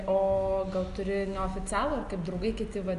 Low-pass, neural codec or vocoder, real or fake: 10.8 kHz; none; real